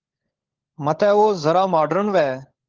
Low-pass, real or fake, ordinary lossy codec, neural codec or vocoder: 7.2 kHz; fake; Opus, 16 kbps; codec, 16 kHz, 16 kbps, FunCodec, trained on LibriTTS, 50 frames a second